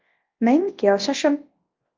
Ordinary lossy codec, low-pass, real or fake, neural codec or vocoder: Opus, 24 kbps; 7.2 kHz; fake; codec, 24 kHz, 0.9 kbps, WavTokenizer, large speech release